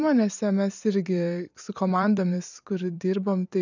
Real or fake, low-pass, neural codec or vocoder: fake; 7.2 kHz; vocoder, 44.1 kHz, 128 mel bands every 256 samples, BigVGAN v2